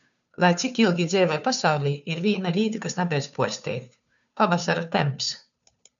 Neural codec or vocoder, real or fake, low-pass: codec, 16 kHz, 2 kbps, FunCodec, trained on Chinese and English, 25 frames a second; fake; 7.2 kHz